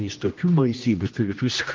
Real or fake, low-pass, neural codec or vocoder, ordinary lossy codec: fake; 7.2 kHz; codec, 16 kHz, 1 kbps, X-Codec, HuBERT features, trained on general audio; Opus, 24 kbps